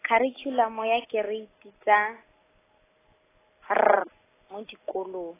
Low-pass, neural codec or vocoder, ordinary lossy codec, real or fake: 3.6 kHz; none; AAC, 16 kbps; real